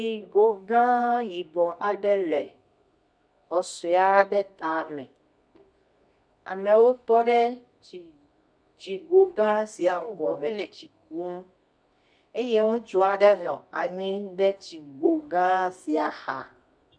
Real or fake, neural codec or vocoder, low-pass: fake; codec, 24 kHz, 0.9 kbps, WavTokenizer, medium music audio release; 9.9 kHz